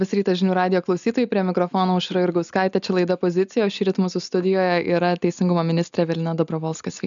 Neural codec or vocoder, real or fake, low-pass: none; real; 7.2 kHz